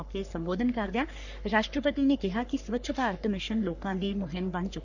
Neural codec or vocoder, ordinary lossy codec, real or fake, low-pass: codec, 44.1 kHz, 3.4 kbps, Pupu-Codec; none; fake; 7.2 kHz